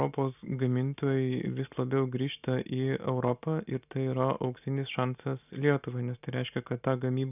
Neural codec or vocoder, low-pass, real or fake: none; 3.6 kHz; real